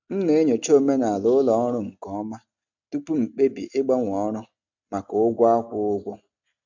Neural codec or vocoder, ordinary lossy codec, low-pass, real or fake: none; none; 7.2 kHz; real